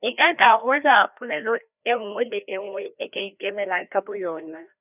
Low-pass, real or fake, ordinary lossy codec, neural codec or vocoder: 3.6 kHz; fake; none; codec, 16 kHz, 1 kbps, FreqCodec, larger model